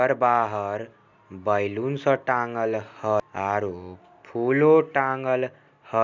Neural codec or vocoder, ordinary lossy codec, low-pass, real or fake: none; none; 7.2 kHz; real